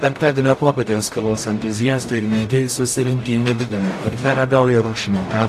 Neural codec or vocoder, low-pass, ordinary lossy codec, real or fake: codec, 44.1 kHz, 0.9 kbps, DAC; 14.4 kHz; AAC, 64 kbps; fake